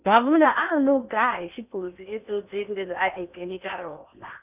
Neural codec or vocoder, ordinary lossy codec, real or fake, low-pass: codec, 16 kHz in and 24 kHz out, 0.8 kbps, FocalCodec, streaming, 65536 codes; none; fake; 3.6 kHz